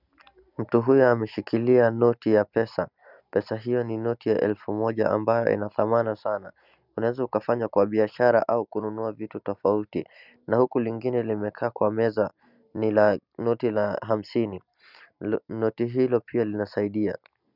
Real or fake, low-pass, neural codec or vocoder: real; 5.4 kHz; none